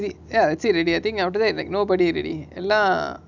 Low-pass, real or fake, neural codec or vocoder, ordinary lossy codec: 7.2 kHz; real; none; none